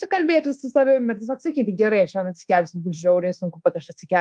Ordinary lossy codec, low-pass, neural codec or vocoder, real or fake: Opus, 24 kbps; 9.9 kHz; codec, 24 kHz, 1.2 kbps, DualCodec; fake